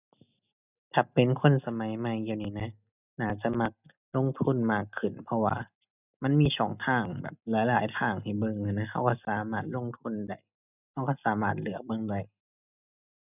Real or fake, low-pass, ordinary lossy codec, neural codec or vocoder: real; 3.6 kHz; none; none